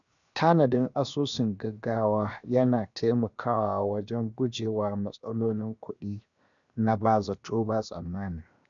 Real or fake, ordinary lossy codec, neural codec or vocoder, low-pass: fake; none; codec, 16 kHz, 0.7 kbps, FocalCodec; 7.2 kHz